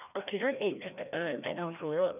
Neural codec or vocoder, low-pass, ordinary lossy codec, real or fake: codec, 16 kHz, 1 kbps, FreqCodec, larger model; 3.6 kHz; none; fake